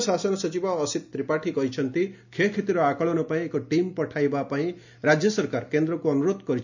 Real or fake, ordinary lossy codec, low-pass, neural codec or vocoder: real; none; 7.2 kHz; none